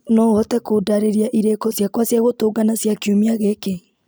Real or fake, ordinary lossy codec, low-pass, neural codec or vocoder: real; none; none; none